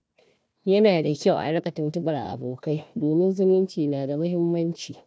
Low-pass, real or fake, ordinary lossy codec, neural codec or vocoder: none; fake; none; codec, 16 kHz, 1 kbps, FunCodec, trained on Chinese and English, 50 frames a second